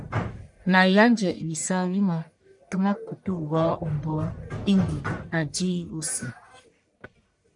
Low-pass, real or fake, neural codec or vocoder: 10.8 kHz; fake; codec, 44.1 kHz, 1.7 kbps, Pupu-Codec